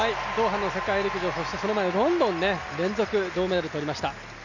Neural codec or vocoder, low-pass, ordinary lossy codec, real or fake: none; 7.2 kHz; none; real